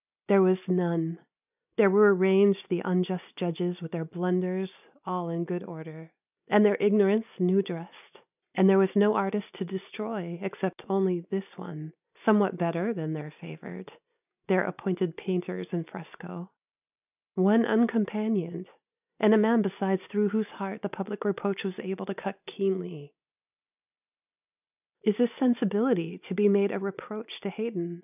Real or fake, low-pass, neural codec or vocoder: real; 3.6 kHz; none